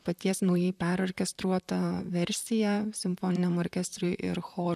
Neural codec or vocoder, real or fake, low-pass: vocoder, 44.1 kHz, 128 mel bands, Pupu-Vocoder; fake; 14.4 kHz